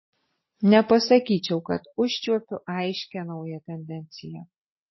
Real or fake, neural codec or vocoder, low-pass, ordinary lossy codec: real; none; 7.2 kHz; MP3, 24 kbps